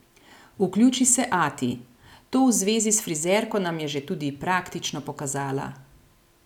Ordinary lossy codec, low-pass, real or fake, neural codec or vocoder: none; 19.8 kHz; real; none